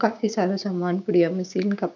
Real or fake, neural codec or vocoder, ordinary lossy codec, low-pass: fake; codec, 16 kHz, 6 kbps, DAC; none; 7.2 kHz